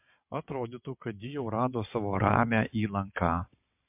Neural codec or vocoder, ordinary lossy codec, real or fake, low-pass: vocoder, 22.05 kHz, 80 mel bands, WaveNeXt; MP3, 32 kbps; fake; 3.6 kHz